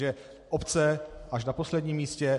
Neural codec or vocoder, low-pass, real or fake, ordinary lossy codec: none; 14.4 kHz; real; MP3, 48 kbps